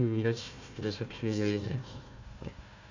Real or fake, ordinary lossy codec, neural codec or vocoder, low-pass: fake; none; codec, 16 kHz, 1 kbps, FunCodec, trained on Chinese and English, 50 frames a second; 7.2 kHz